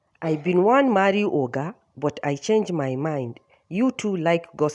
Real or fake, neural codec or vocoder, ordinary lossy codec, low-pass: real; none; none; 10.8 kHz